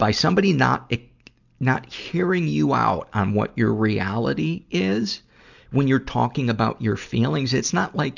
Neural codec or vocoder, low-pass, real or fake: none; 7.2 kHz; real